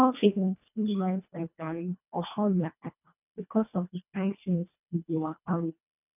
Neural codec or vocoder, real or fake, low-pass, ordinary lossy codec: codec, 24 kHz, 1.5 kbps, HILCodec; fake; 3.6 kHz; none